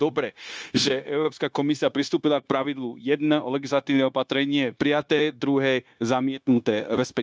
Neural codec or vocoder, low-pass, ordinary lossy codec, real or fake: codec, 16 kHz, 0.9 kbps, LongCat-Audio-Codec; none; none; fake